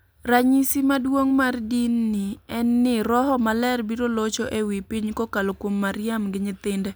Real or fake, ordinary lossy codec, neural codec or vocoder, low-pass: real; none; none; none